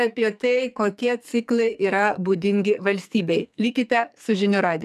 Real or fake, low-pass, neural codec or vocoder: fake; 14.4 kHz; codec, 44.1 kHz, 2.6 kbps, SNAC